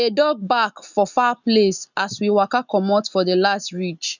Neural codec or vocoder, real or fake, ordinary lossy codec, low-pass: none; real; none; 7.2 kHz